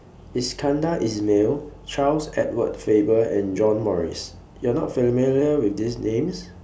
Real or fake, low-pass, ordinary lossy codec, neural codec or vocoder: real; none; none; none